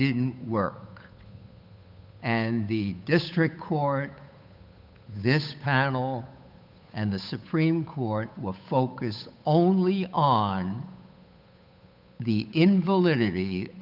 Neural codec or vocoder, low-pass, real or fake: codec, 16 kHz, 8 kbps, FunCodec, trained on Chinese and English, 25 frames a second; 5.4 kHz; fake